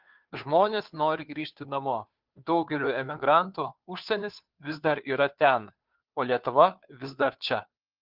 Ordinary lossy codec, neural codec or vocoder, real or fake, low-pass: Opus, 24 kbps; codec, 16 kHz, 2 kbps, FunCodec, trained on Chinese and English, 25 frames a second; fake; 5.4 kHz